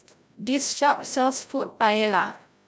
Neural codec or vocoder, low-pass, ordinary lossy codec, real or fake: codec, 16 kHz, 0.5 kbps, FreqCodec, larger model; none; none; fake